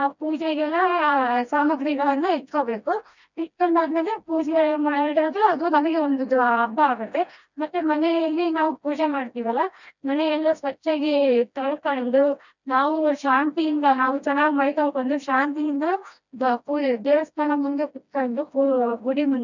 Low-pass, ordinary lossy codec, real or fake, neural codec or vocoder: 7.2 kHz; none; fake; codec, 16 kHz, 1 kbps, FreqCodec, smaller model